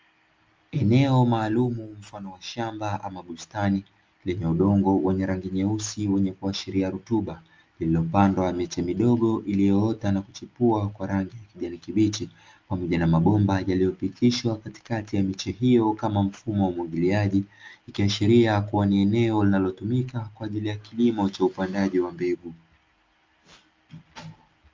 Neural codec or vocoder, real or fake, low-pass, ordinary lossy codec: none; real; 7.2 kHz; Opus, 24 kbps